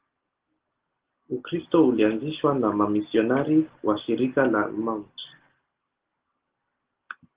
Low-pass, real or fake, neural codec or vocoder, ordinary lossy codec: 3.6 kHz; real; none; Opus, 16 kbps